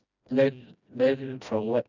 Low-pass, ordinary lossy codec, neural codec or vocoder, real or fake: 7.2 kHz; none; codec, 16 kHz, 1 kbps, FreqCodec, smaller model; fake